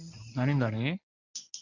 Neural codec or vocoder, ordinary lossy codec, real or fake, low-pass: codec, 24 kHz, 0.9 kbps, WavTokenizer, medium speech release version 1; none; fake; 7.2 kHz